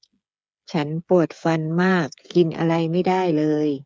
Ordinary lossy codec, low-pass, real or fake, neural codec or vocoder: none; none; fake; codec, 16 kHz, 8 kbps, FreqCodec, smaller model